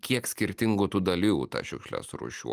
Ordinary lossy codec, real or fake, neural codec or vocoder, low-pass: Opus, 32 kbps; real; none; 14.4 kHz